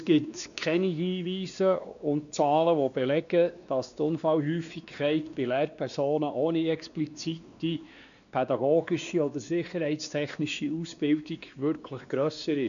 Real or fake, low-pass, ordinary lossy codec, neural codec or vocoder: fake; 7.2 kHz; none; codec, 16 kHz, 2 kbps, X-Codec, WavLM features, trained on Multilingual LibriSpeech